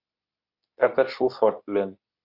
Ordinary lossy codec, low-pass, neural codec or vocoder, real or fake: Opus, 64 kbps; 5.4 kHz; codec, 24 kHz, 0.9 kbps, WavTokenizer, medium speech release version 2; fake